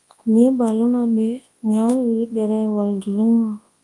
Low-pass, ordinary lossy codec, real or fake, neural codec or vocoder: 10.8 kHz; Opus, 32 kbps; fake; codec, 24 kHz, 0.9 kbps, WavTokenizer, large speech release